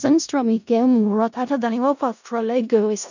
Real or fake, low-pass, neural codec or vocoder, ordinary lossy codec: fake; 7.2 kHz; codec, 16 kHz in and 24 kHz out, 0.4 kbps, LongCat-Audio-Codec, four codebook decoder; none